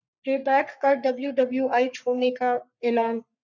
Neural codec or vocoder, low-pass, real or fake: codec, 44.1 kHz, 3.4 kbps, Pupu-Codec; 7.2 kHz; fake